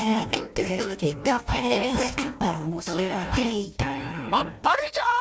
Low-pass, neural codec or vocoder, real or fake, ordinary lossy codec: none; codec, 16 kHz, 1 kbps, FunCodec, trained on Chinese and English, 50 frames a second; fake; none